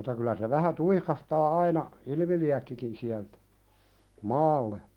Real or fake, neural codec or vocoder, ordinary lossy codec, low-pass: fake; autoencoder, 48 kHz, 128 numbers a frame, DAC-VAE, trained on Japanese speech; Opus, 24 kbps; 19.8 kHz